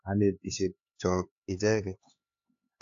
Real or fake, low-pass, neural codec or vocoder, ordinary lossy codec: fake; 7.2 kHz; codec, 16 kHz, 4 kbps, X-Codec, HuBERT features, trained on LibriSpeech; AAC, 48 kbps